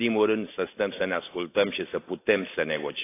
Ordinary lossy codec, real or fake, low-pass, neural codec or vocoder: AAC, 24 kbps; real; 3.6 kHz; none